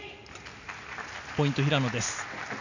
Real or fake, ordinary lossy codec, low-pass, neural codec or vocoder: real; none; 7.2 kHz; none